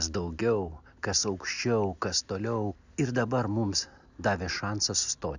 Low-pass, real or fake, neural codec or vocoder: 7.2 kHz; real; none